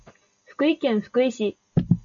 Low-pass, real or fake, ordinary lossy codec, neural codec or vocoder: 7.2 kHz; real; AAC, 64 kbps; none